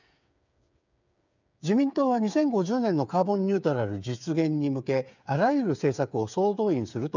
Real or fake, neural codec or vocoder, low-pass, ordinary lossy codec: fake; codec, 16 kHz, 8 kbps, FreqCodec, smaller model; 7.2 kHz; none